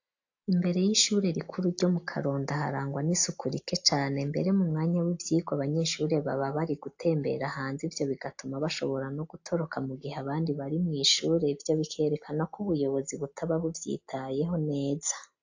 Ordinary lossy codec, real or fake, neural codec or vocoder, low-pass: AAC, 48 kbps; real; none; 7.2 kHz